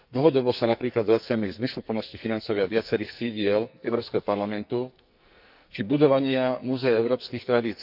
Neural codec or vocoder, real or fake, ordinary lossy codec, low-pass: codec, 44.1 kHz, 2.6 kbps, SNAC; fake; none; 5.4 kHz